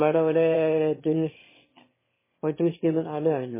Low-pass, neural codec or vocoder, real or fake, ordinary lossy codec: 3.6 kHz; autoencoder, 22.05 kHz, a latent of 192 numbers a frame, VITS, trained on one speaker; fake; MP3, 16 kbps